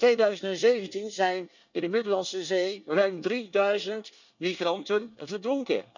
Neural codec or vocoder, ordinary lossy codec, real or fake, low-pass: codec, 24 kHz, 1 kbps, SNAC; none; fake; 7.2 kHz